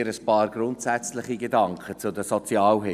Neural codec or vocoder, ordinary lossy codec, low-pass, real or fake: none; none; 14.4 kHz; real